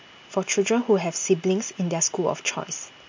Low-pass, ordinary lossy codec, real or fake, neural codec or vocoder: 7.2 kHz; MP3, 48 kbps; real; none